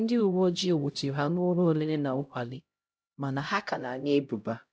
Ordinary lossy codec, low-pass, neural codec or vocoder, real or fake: none; none; codec, 16 kHz, 0.5 kbps, X-Codec, HuBERT features, trained on LibriSpeech; fake